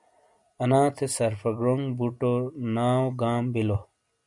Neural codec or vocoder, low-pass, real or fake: none; 10.8 kHz; real